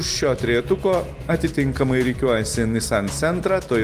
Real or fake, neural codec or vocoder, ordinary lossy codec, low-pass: real; none; Opus, 24 kbps; 14.4 kHz